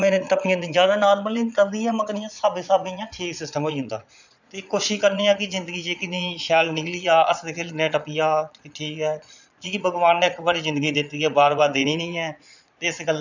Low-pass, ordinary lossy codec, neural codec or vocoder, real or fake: 7.2 kHz; none; vocoder, 22.05 kHz, 80 mel bands, Vocos; fake